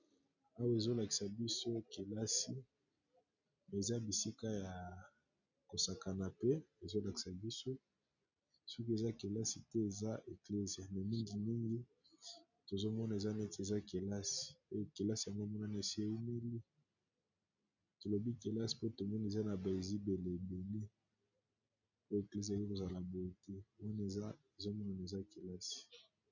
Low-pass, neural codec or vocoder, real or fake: 7.2 kHz; none; real